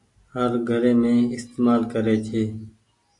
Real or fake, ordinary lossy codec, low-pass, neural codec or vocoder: real; AAC, 48 kbps; 10.8 kHz; none